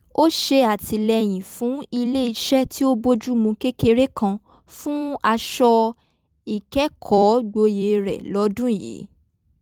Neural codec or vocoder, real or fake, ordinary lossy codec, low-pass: vocoder, 44.1 kHz, 128 mel bands every 256 samples, BigVGAN v2; fake; Opus, 32 kbps; 19.8 kHz